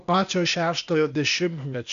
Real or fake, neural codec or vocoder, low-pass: fake; codec, 16 kHz, 0.8 kbps, ZipCodec; 7.2 kHz